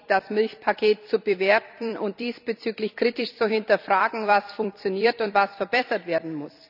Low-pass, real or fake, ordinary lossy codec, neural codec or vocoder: 5.4 kHz; fake; none; vocoder, 44.1 kHz, 128 mel bands every 256 samples, BigVGAN v2